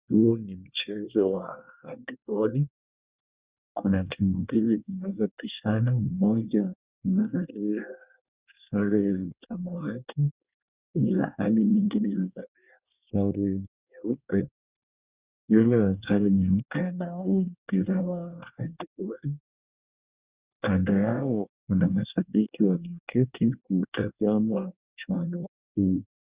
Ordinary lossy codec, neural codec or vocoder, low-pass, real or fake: Opus, 64 kbps; codec, 24 kHz, 1 kbps, SNAC; 3.6 kHz; fake